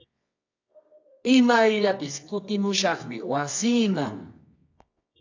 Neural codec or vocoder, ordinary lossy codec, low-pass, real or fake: codec, 24 kHz, 0.9 kbps, WavTokenizer, medium music audio release; AAC, 48 kbps; 7.2 kHz; fake